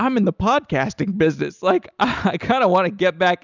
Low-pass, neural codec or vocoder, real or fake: 7.2 kHz; none; real